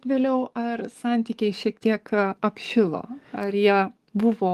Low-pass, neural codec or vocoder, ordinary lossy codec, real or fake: 14.4 kHz; codec, 44.1 kHz, 7.8 kbps, DAC; Opus, 32 kbps; fake